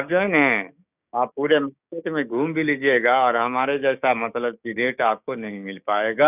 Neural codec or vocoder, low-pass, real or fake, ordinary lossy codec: codec, 16 kHz, 6 kbps, DAC; 3.6 kHz; fake; none